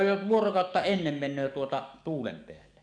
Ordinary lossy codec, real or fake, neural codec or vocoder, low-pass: none; fake; codec, 44.1 kHz, 7.8 kbps, DAC; 9.9 kHz